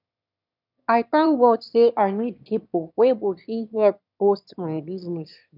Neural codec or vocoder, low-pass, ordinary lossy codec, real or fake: autoencoder, 22.05 kHz, a latent of 192 numbers a frame, VITS, trained on one speaker; 5.4 kHz; none; fake